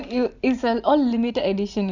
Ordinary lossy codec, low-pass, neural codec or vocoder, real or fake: none; 7.2 kHz; vocoder, 44.1 kHz, 128 mel bands every 512 samples, BigVGAN v2; fake